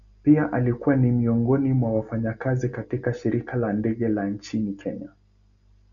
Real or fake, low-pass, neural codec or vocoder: real; 7.2 kHz; none